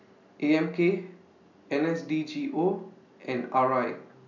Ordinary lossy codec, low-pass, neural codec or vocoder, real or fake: none; 7.2 kHz; none; real